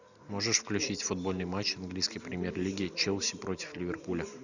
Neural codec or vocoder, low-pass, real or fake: none; 7.2 kHz; real